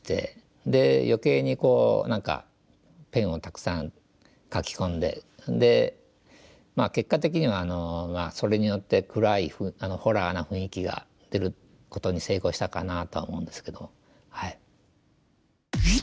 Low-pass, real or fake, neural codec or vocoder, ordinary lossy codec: none; real; none; none